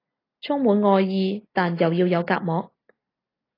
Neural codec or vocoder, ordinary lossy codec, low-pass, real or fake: none; AAC, 24 kbps; 5.4 kHz; real